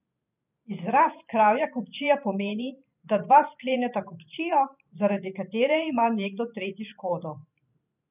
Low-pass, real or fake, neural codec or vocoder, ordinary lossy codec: 3.6 kHz; fake; vocoder, 44.1 kHz, 128 mel bands every 512 samples, BigVGAN v2; none